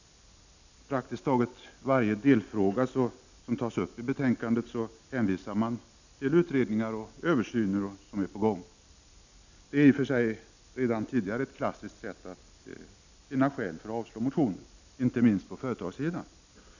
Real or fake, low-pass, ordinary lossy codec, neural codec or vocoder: real; 7.2 kHz; none; none